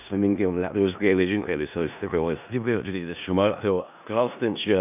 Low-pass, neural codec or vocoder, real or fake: 3.6 kHz; codec, 16 kHz in and 24 kHz out, 0.4 kbps, LongCat-Audio-Codec, four codebook decoder; fake